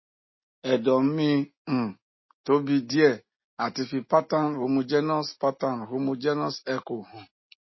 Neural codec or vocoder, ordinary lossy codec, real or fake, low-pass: none; MP3, 24 kbps; real; 7.2 kHz